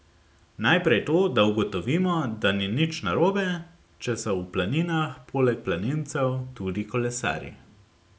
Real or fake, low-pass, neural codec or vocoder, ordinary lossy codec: real; none; none; none